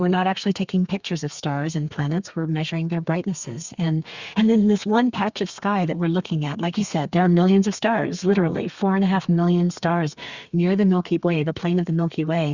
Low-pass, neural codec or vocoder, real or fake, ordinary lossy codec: 7.2 kHz; codec, 32 kHz, 1.9 kbps, SNAC; fake; Opus, 64 kbps